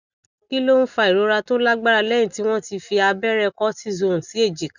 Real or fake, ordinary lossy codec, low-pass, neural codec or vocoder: real; none; 7.2 kHz; none